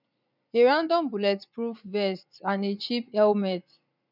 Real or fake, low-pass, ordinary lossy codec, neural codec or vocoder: fake; 5.4 kHz; none; vocoder, 44.1 kHz, 80 mel bands, Vocos